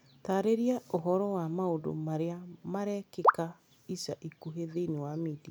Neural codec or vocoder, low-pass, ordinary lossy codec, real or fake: none; none; none; real